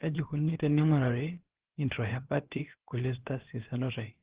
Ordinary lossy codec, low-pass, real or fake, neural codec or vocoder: Opus, 16 kbps; 3.6 kHz; fake; codec, 16 kHz, about 1 kbps, DyCAST, with the encoder's durations